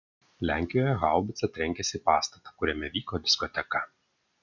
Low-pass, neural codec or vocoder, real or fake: 7.2 kHz; none; real